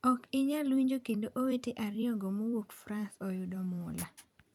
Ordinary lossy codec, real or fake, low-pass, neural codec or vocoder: none; fake; 19.8 kHz; vocoder, 44.1 kHz, 128 mel bands every 256 samples, BigVGAN v2